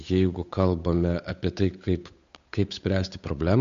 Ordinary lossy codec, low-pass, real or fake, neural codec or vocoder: MP3, 48 kbps; 7.2 kHz; fake; codec, 16 kHz, 8 kbps, FunCodec, trained on Chinese and English, 25 frames a second